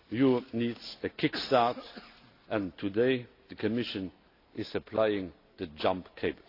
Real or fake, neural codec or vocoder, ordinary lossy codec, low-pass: real; none; AAC, 32 kbps; 5.4 kHz